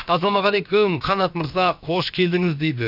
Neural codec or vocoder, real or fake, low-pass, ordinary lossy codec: codec, 16 kHz, about 1 kbps, DyCAST, with the encoder's durations; fake; 5.4 kHz; none